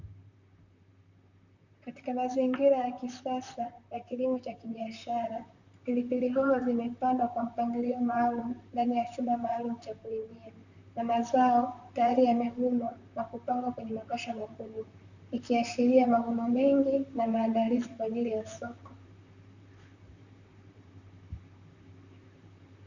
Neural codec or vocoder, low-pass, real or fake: vocoder, 44.1 kHz, 128 mel bands, Pupu-Vocoder; 7.2 kHz; fake